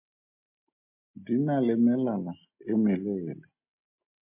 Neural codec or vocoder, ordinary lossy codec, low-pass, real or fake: none; MP3, 32 kbps; 3.6 kHz; real